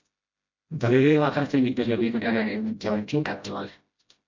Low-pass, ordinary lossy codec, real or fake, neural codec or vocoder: 7.2 kHz; MP3, 48 kbps; fake; codec, 16 kHz, 0.5 kbps, FreqCodec, smaller model